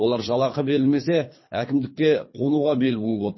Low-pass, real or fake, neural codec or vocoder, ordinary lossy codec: 7.2 kHz; fake; codec, 24 kHz, 3 kbps, HILCodec; MP3, 24 kbps